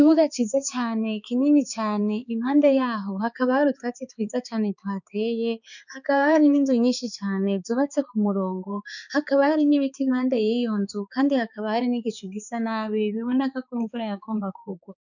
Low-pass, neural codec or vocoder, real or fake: 7.2 kHz; codec, 16 kHz, 4 kbps, X-Codec, HuBERT features, trained on balanced general audio; fake